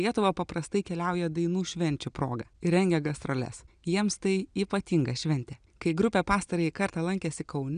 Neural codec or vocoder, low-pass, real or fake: none; 9.9 kHz; real